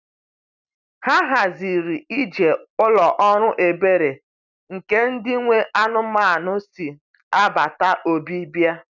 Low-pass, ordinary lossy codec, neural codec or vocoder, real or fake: 7.2 kHz; none; none; real